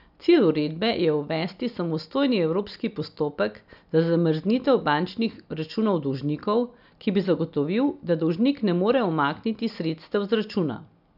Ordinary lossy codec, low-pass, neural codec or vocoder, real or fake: none; 5.4 kHz; none; real